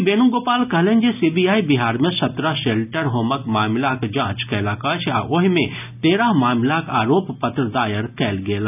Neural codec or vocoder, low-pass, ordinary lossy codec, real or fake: none; 3.6 kHz; none; real